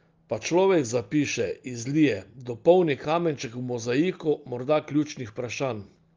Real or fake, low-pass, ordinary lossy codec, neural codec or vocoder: real; 7.2 kHz; Opus, 32 kbps; none